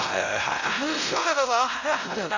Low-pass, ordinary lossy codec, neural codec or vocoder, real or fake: 7.2 kHz; none; codec, 16 kHz, 0.5 kbps, X-Codec, WavLM features, trained on Multilingual LibriSpeech; fake